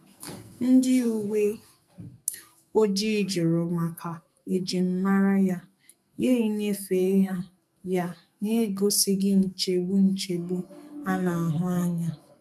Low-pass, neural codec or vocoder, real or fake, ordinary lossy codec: 14.4 kHz; codec, 44.1 kHz, 2.6 kbps, SNAC; fake; none